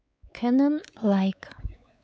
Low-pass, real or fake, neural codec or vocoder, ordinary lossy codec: none; fake; codec, 16 kHz, 4 kbps, X-Codec, WavLM features, trained on Multilingual LibriSpeech; none